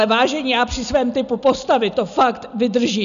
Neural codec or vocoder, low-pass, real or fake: none; 7.2 kHz; real